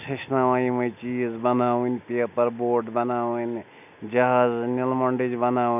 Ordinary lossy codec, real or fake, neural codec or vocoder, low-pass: none; real; none; 3.6 kHz